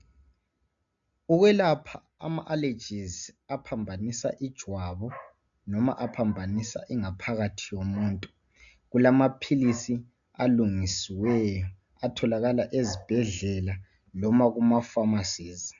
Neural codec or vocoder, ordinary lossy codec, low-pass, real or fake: none; AAC, 64 kbps; 7.2 kHz; real